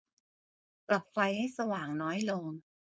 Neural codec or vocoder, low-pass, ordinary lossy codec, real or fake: codec, 16 kHz, 4.8 kbps, FACodec; none; none; fake